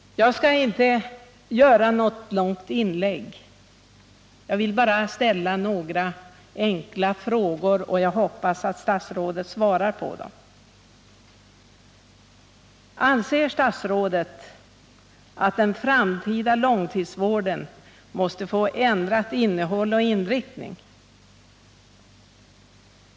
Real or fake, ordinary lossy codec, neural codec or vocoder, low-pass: real; none; none; none